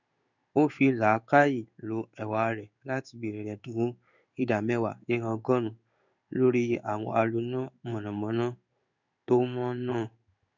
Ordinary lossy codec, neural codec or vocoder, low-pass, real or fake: none; codec, 16 kHz in and 24 kHz out, 1 kbps, XY-Tokenizer; 7.2 kHz; fake